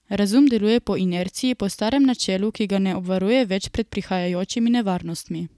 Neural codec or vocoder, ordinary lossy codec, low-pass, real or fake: none; none; none; real